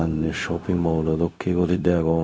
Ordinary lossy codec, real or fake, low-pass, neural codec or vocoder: none; fake; none; codec, 16 kHz, 0.4 kbps, LongCat-Audio-Codec